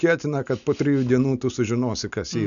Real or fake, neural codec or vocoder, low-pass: real; none; 7.2 kHz